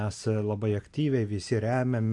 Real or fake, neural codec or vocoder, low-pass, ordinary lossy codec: real; none; 10.8 kHz; AAC, 64 kbps